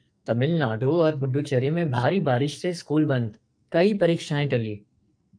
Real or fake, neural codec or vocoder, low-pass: fake; codec, 44.1 kHz, 2.6 kbps, SNAC; 9.9 kHz